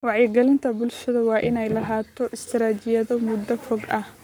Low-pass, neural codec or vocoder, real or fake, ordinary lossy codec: none; codec, 44.1 kHz, 7.8 kbps, Pupu-Codec; fake; none